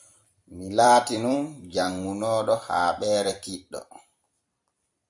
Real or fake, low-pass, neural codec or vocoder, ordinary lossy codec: fake; 10.8 kHz; vocoder, 44.1 kHz, 128 mel bands every 256 samples, BigVGAN v2; MP3, 64 kbps